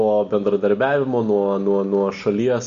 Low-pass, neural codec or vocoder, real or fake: 7.2 kHz; none; real